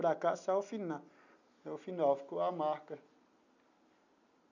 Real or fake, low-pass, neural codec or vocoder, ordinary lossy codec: real; 7.2 kHz; none; none